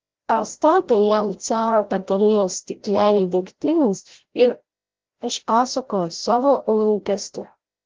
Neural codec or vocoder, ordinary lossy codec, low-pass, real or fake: codec, 16 kHz, 0.5 kbps, FreqCodec, larger model; Opus, 16 kbps; 7.2 kHz; fake